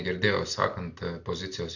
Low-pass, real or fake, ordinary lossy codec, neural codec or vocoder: 7.2 kHz; real; AAC, 48 kbps; none